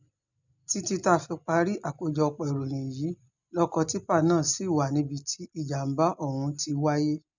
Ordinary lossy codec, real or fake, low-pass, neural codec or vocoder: none; real; 7.2 kHz; none